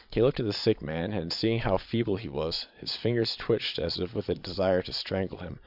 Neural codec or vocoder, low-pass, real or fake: vocoder, 22.05 kHz, 80 mel bands, Vocos; 5.4 kHz; fake